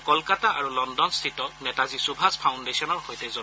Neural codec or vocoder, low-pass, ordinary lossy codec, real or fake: none; none; none; real